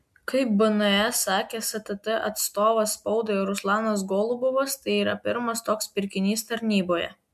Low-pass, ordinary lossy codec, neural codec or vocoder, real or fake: 14.4 kHz; MP3, 96 kbps; none; real